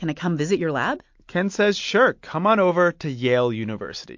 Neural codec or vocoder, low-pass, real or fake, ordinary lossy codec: none; 7.2 kHz; real; MP3, 48 kbps